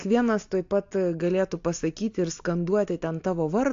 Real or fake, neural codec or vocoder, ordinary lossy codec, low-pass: real; none; AAC, 48 kbps; 7.2 kHz